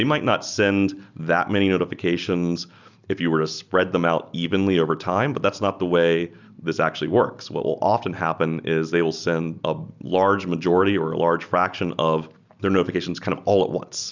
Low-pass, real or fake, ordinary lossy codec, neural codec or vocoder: 7.2 kHz; real; Opus, 64 kbps; none